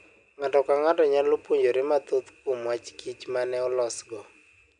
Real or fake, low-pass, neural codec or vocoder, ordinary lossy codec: real; 9.9 kHz; none; none